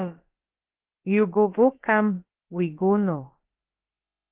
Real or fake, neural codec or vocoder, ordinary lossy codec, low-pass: fake; codec, 16 kHz, about 1 kbps, DyCAST, with the encoder's durations; Opus, 16 kbps; 3.6 kHz